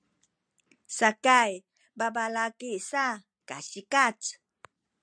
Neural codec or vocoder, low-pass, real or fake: none; 9.9 kHz; real